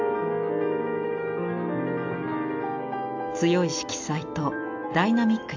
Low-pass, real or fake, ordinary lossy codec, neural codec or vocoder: 7.2 kHz; real; AAC, 48 kbps; none